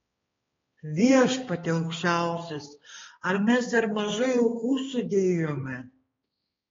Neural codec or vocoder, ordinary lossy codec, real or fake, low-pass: codec, 16 kHz, 2 kbps, X-Codec, HuBERT features, trained on balanced general audio; AAC, 24 kbps; fake; 7.2 kHz